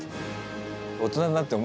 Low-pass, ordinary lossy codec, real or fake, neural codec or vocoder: none; none; real; none